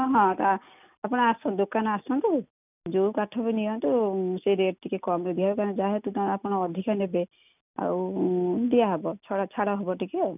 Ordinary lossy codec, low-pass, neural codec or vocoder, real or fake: none; 3.6 kHz; none; real